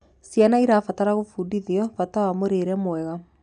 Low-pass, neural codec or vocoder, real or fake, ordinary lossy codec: 9.9 kHz; none; real; none